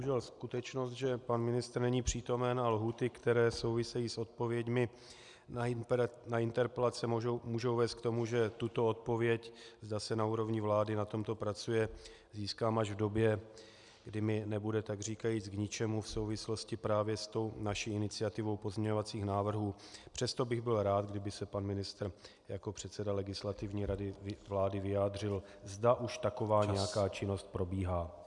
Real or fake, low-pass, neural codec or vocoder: real; 10.8 kHz; none